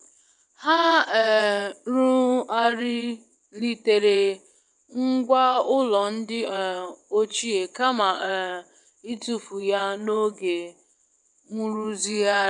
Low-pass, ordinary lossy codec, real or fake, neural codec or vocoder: 9.9 kHz; none; fake; vocoder, 22.05 kHz, 80 mel bands, WaveNeXt